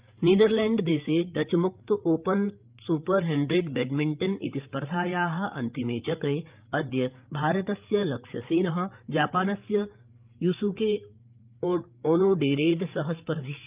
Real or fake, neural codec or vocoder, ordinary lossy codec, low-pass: fake; codec, 16 kHz, 8 kbps, FreqCodec, larger model; Opus, 24 kbps; 3.6 kHz